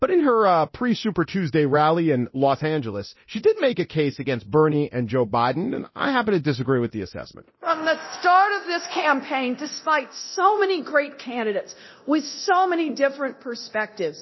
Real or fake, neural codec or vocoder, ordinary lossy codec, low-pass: fake; codec, 24 kHz, 0.9 kbps, DualCodec; MP3, 24 kbps; 7.2 kHz